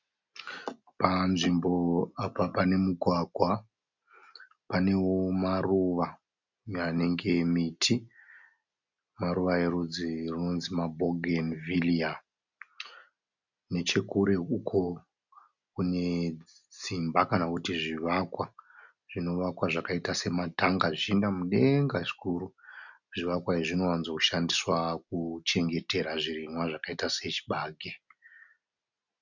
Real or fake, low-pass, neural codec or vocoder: real; 7.2 kHz; none